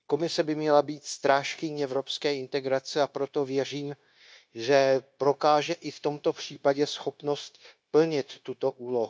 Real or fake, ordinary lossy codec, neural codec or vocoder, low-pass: fake; none; codec, 16 kHz, 0.9 kbps, LongCat-Audio-Codec; none